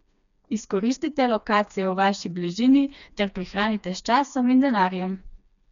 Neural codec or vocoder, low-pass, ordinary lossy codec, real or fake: codec, 16 kHz, 2 kbps, FreqCodec, smaller model; 7.2 kHz; none; fake